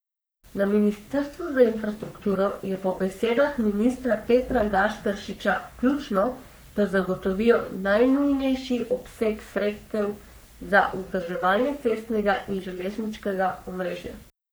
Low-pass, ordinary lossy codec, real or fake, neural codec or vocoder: none; none; fake; codec, 44.1 kHz, 3.4 kbps, Pupu-Codec